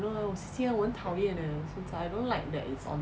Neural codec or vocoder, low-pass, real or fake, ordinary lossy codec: none; none; real; none